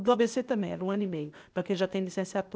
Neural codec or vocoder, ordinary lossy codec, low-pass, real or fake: codec, 16 kHz, 0.8 kbps, ZipCodec; none; none; fake